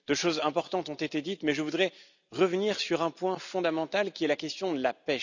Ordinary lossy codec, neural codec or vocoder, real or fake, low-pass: none; none; real; 7.2 kHz